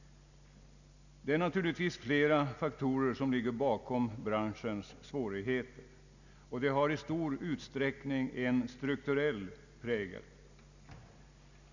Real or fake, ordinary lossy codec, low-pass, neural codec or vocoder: real; none; 7.2 kHz; none